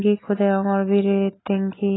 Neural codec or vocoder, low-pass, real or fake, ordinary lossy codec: none; 7.2 kHz; real; AAC, 16 kbps